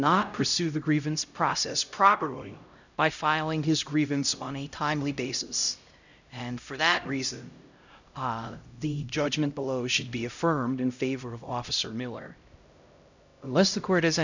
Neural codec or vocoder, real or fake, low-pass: codec, 16 kHz, 0.5 kbps, X-Codec, HuBERT features, trained on LibriSpeech; fake; 7.2 kHz